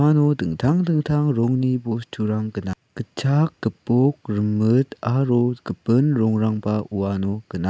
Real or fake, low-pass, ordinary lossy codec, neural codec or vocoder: real; none; none; none